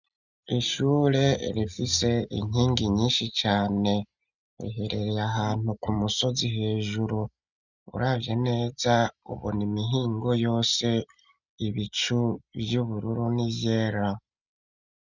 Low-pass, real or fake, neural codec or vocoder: 7.2 kHz; real; none